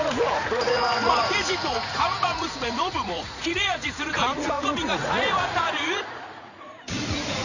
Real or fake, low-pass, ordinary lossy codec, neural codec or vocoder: fake; 7.2 kHz; none; vocoder, 44.1 kHz, 80 mel bands, Vocos